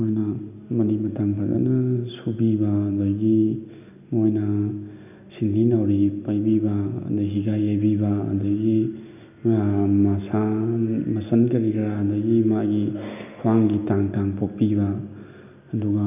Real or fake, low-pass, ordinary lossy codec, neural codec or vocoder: real; 3.6 kHz; none; none